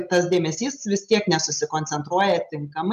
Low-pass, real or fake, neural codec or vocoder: 14.4 kHz; real; none